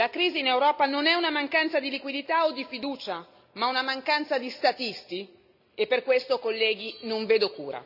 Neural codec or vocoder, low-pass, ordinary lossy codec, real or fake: none; 5.4 kHz; none; real